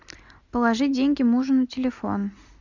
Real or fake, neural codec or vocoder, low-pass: real; none; 7.2 kHz